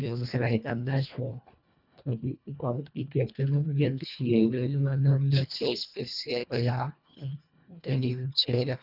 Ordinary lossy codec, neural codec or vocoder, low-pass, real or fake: none; codec, 24 kHz, 1.5 kbps, HILCodec; 5.4 kHz; fake